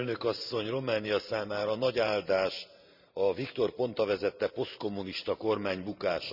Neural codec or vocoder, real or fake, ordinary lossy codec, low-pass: vocoder, 44.1 kHz, 128 mel bands every 512 samples, BigVGAN v2; fake; none; 5.4 kHz